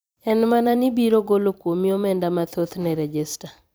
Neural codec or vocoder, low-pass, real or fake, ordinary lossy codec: vocoder, 44.1 kHz, 128 mel bands every 256 samples, BigVGAN v2; none; fake; none